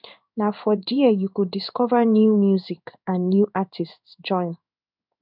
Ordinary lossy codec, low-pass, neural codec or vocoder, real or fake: none; 5.4 kHz; codec, 16 kHz in and 24 kHz out, 1 kbps, XY-Tokenizer; fake